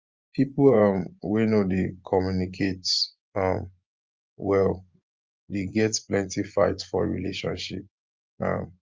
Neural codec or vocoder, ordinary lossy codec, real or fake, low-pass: vocoder, 24 kHz, 100 mel bands, Vocos; Opus, 24 kbps; fake; 7.2 kHz